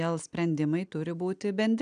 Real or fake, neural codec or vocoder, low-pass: real; none; 9.9 kHz